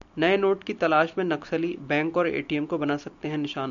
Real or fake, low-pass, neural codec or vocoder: real; 7.2 kHz; none